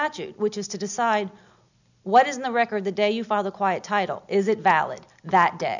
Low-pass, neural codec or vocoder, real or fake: 7.2 kHz; none; real